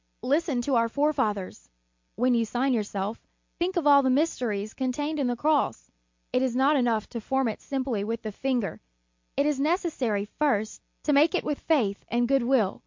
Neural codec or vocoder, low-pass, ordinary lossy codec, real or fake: none; 7.2 kHz; MP3, 48 kbps; real